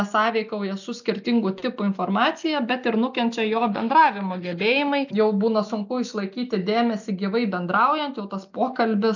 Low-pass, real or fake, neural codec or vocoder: 7.2 kHz; real; none